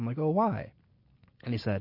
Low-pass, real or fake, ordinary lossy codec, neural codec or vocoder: 5.4 kHz; real; MP3, 32 kbps; none